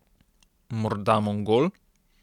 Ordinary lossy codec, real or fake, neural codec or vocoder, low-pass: none; real; none; 19.8 kHz